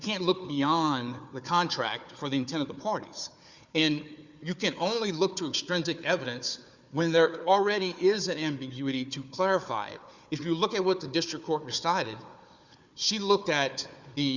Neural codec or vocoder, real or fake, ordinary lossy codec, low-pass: codec, 16 kHz, 4 kbps, FunCodec, trained on Chinese and English, 50 frames a second; fake; Opus, 64 kbps; 7.2 kHz